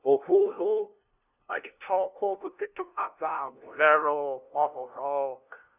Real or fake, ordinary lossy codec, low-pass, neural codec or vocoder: fake; none; 3.6 kHz; codec, 16 kHz, 0.5 kbps, FunCodec, trained on LibriTTS, 25 frames a second